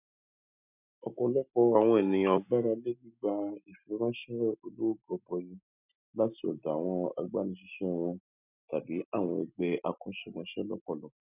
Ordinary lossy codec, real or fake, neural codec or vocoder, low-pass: none; real; none; 3.6 kHz